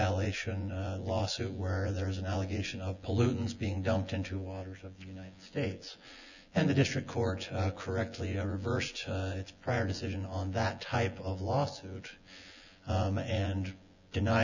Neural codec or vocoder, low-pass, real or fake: vocoder, 24 kHz, 100 mel bands, Vocos; 7.2 kHz; fake